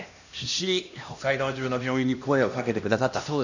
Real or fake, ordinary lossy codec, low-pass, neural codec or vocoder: fake; none; 7.2 kHz; codec, 16 kHz, 1 kbps, X-Codec, HuBERT features, trained on LibriSpeech